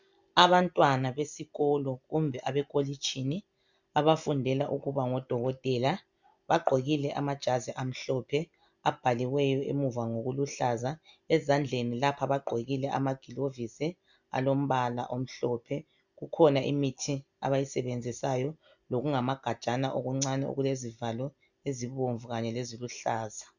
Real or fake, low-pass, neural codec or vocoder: real; 7.2 kHz; none